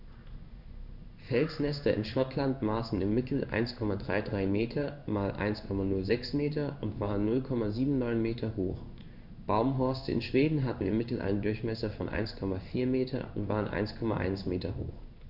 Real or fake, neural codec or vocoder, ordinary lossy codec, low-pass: fake; codec, 16 kHz in and 24 kHz out, 1 kbps, XY-Tokenizer; none; 5.4 kHz